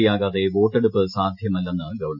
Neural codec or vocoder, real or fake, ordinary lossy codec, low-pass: none; real; none; 5.4 kHz